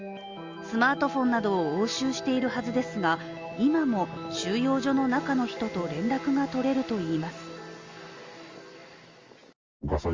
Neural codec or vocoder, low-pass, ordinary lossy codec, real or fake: none; 7.2 kHz; Opus, 32 kbps; real